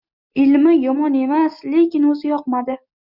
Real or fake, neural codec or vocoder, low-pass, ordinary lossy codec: real; none; 5.4 kHz; Opus, 64 kbps